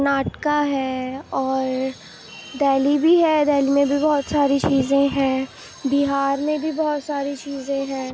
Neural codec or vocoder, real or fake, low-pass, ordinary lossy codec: none; real; none; none